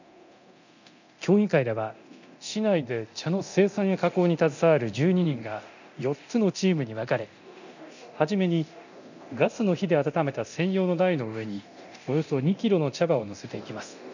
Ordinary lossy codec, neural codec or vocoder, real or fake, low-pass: none; codec, 24 kHz, 0.9 kbps, DualCodec; fake; 7.2 kHz